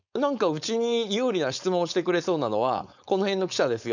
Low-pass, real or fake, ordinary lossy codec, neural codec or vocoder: 7.2 kHz; fake; none; codec, 16 kHz, 4.8 kbps, FACodec